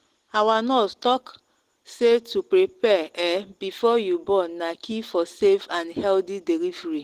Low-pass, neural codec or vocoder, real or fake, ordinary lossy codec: 14.4 kHz; none; real; Opus, 16 kbps